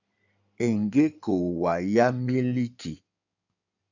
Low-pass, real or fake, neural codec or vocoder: 7.2 kHz; fake; codec, 16 kHz in and 24 kHz out, 2.2 kbps, FireRedTTS-2 codec